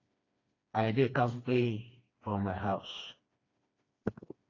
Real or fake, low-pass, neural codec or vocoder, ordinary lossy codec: fake; 7.2 kHz; codec, 16 kHz, 2 kbps, FreqCodec, smaller model; AAC, 32 kbps